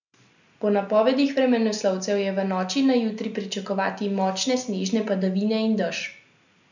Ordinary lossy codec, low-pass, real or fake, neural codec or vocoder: none; 7.2 kHz; real; none